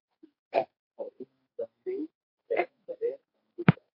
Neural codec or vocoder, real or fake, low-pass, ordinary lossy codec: codec, 32 kHz, 1.9 kbps, SNAC; fake; 5.4 kHz; MP3, 32 kbps